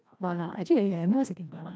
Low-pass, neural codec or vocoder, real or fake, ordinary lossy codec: none; codec, 16 kHz, 1 kbps, FreqCodec, larger model; fake; none